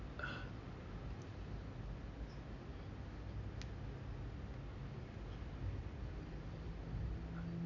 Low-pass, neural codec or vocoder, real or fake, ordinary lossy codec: 7.2 kHz; none; real; none